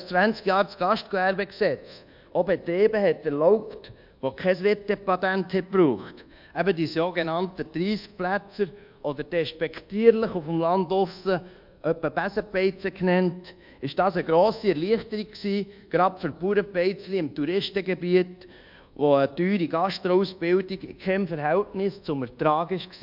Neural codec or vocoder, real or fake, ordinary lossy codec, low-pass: codec, 24 kHz, 1.2 kbps, DualCodec; fake; MP3, 48 kbps; 5.4 kHz